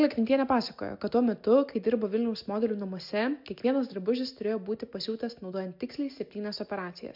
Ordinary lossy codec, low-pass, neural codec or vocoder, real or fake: MP3, 48 kbps; 5.4 kHz; none; real